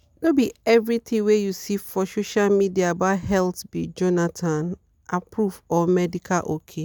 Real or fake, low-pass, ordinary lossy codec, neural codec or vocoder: real; none; none; none